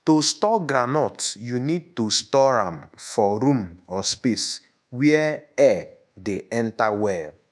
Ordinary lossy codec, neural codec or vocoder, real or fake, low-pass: none; codec, 24 kHz, 1.2 kbps, DualCodec; fake; none